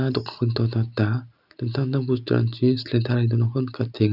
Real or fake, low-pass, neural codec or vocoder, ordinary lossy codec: real; 5.4 kHz; none; none